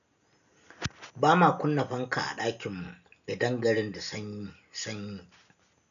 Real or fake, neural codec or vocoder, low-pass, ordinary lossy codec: real; none; 7.2 kHz; none